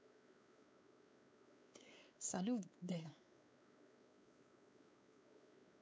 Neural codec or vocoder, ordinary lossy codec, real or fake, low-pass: codec, 16 kHz, 4 kbps, X-Codec, WavLM features, trained on Multilingual LibriSpeech; none; fake; none